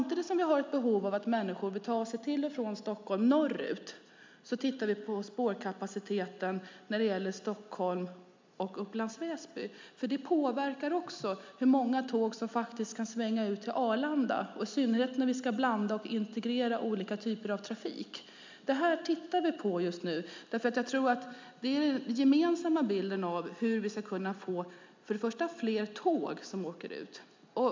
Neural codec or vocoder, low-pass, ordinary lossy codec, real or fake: none; 7.2 kHz; MP3, 64 kbps; real